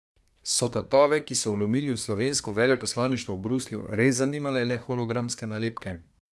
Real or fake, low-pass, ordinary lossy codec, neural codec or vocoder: fake; none; none; codec, 24 kHz, 1 kbps, SNAC